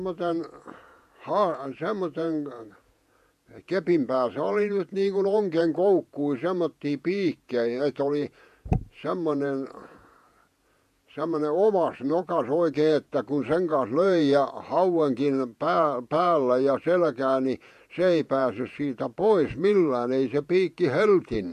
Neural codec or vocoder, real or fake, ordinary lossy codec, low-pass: vocoder, 48 kHz, 128 mel bands, Vocos; fake; MP3, 64 kbps; 14.4 kHz